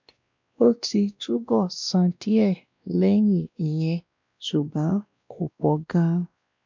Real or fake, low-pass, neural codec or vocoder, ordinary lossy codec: fake; 7.2 kHz; codec, 16 kHz, 1 kbps, X-Codec, WavLM features, trained on Multilingual LibriSpeech; MP3, 64 kbps